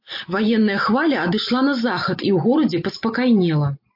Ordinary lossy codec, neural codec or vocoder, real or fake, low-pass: MP3, 32 kbps; none; real; 5.4 kHz